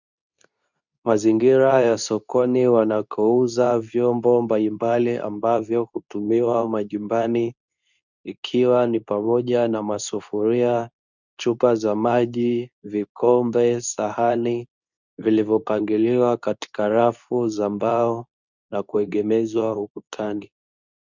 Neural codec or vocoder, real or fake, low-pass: codec, 24 kHz, 0.9 kbps, WavTokenizer, medium speech release version 2; fake; 7.2 kHz